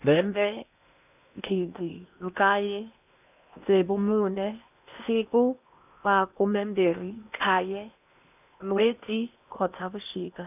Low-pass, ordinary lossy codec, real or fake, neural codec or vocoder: 3.6 kHz; none; fake; codec, 16 kHz in and 24 kHz out, 0.8 kbps, FocalCodec, streaming, 65536 codes